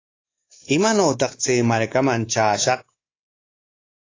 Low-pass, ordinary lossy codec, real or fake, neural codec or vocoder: 7.2 kHz; AAC, 32 kbps; fake; codec, 24 kHz, 3.1 kbps, DualCodec